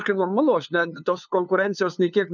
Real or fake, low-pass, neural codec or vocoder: fake; 7.2 kHz; codec, 16 kHz, 2 kbps, FunCodec, trained on LibriTTS, 25 frames a second